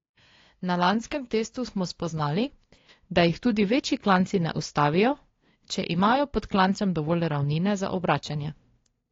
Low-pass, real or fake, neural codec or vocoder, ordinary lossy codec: 7.2 kHz; fake; codec, 16 kHz, 2 kbps, FunCodec, trained on LibriTTS, 25 frames a second; AAC, 32 kbps